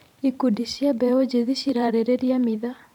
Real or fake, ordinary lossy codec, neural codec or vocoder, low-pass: fake; none; vocoder, 44.1 kHz, 128 mel bands every 512 samples, BigVGAN v2; 19.8 kHz